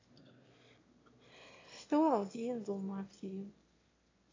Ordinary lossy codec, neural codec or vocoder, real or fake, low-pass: AAC, 32 kbps; autoencoder, 22.05 kHz, a latent of 192 numbers a frame, VITS, trained on one speaker; fake; 7.2 kHz